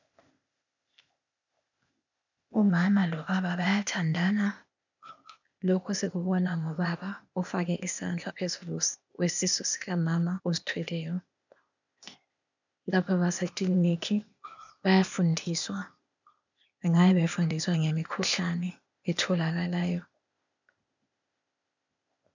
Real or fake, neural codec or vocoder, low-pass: fake; codec, 16 kHz, 0.8 kbps, ZipCodec; 7.2 kHz